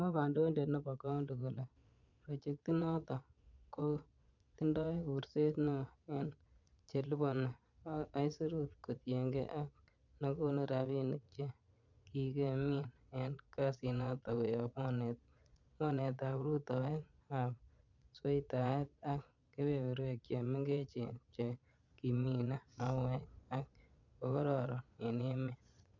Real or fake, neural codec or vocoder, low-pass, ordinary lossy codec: fake; vocoder, 22.05 kHz, 80 mel bands, Vocos; 7.2 kHz; none